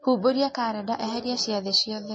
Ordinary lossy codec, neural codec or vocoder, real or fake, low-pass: MP3, 32 kbps; none; real; 9.9 kHz